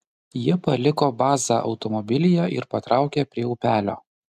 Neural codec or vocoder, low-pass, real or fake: none; 14.4 kHz; real